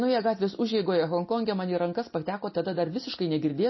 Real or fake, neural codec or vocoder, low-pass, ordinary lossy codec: real; none; 7.2 kHz; MP3, 24 kbps